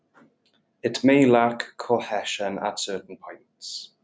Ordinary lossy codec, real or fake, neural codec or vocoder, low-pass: none; real; none; none